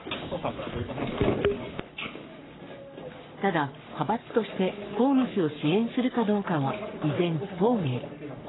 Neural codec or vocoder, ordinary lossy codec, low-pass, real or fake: codec, 44.1 kHz, 3.4 kbps, Pupu-Codec; AAC, 16 kbps; 7.2 kHz; fake